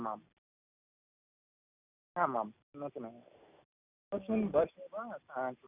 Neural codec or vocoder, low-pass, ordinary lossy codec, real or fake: none; 3.6 kHz; none; real